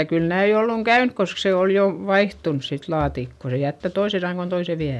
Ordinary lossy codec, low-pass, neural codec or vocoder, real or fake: none; none; none; real